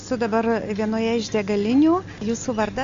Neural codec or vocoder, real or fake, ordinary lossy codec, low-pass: none; real; MP3, 48 kbps; 7.2 kHz